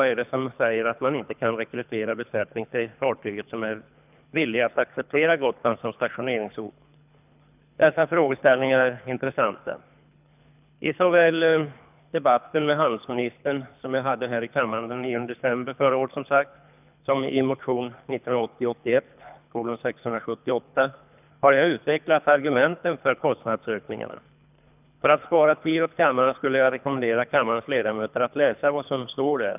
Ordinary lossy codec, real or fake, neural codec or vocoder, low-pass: none; fake; codec, 24 kHz, 3 kbps, HILCodec; 3.6 kHz